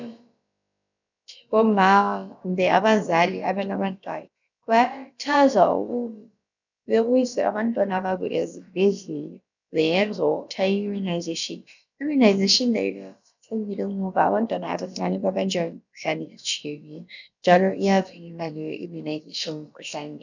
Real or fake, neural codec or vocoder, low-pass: fake; codec, 16 kHz, about 1 kbps, DyCAST, with the encoder's durations; 7.2 kHz